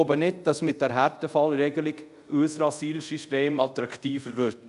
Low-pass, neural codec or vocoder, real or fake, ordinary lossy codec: 10.8 kHz; codec, 24 kHz, 0.5 kbps, DualCodec; fake; none